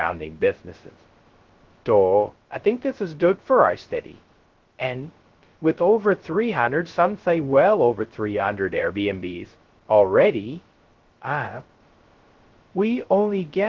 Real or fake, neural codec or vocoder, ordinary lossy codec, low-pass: fake; codec, 16 kHz, 0.2 kbps, FocalCodec; Opus, 16 kbps; 7.2 kHz